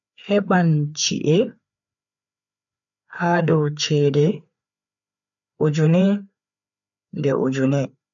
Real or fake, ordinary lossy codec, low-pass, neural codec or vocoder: fake; none; 7.2 kHz; codec, 16 kHz, 4 kbps, FreqCodec, larger model